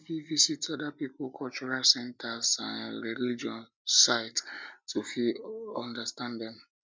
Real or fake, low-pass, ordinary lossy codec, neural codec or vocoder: real; none; none; none